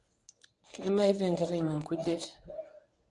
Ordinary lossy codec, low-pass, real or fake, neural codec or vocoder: none; 10.8 kHz; fake; codec, 24 kHz, 0.9 kbps, WavTokenizer, medium speech release version 1